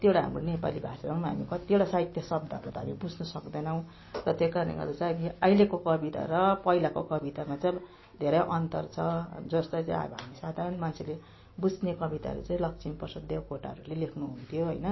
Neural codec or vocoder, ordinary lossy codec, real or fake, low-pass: none; MP3, 24 kbps; real; 7.2 kHz